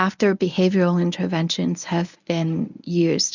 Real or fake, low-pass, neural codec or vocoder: fake; 7.2 kHz; codec, 24 kHz, 0.9 kbps, WavTokenizer, medium speech release version 1